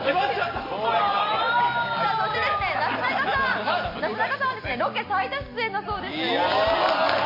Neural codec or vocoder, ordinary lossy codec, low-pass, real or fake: none; MP3, 32 kbps; 5.4 kHz; real